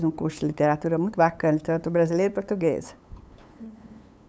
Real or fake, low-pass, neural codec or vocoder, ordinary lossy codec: fake; none; codec, 16 kHz, 8 kbps, FunCodec, trained on LibriTTS, 25 frames a second; none